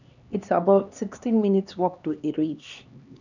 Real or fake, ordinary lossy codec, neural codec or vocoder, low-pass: fake; none; codec, 16 kHz, 2 kbps, X-Codec, HuBERT features, trained on LibriSpeech; 7.2 kHz